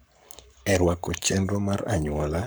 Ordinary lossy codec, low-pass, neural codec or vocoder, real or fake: none; none; codec, 44.1 kHz, 7.8 kbps, Pupu-Codec; fake